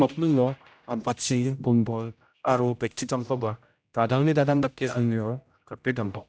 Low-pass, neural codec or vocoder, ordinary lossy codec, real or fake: none; codec, 16 kHz, 0.5 kbps, X-Codec, HuBERT features, trained on general audio; none; fake